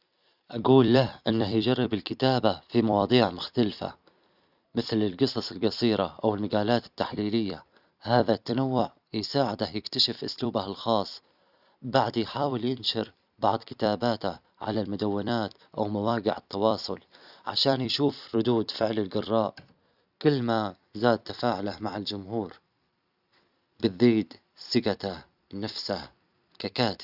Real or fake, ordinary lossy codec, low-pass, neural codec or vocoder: fake; none; 5.4 kHz; vocoder, 44.1 kHz, 128 mel bands every 256 samples, BigVGAN v2